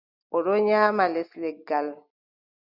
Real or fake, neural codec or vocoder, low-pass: real; none; 5.4 kHz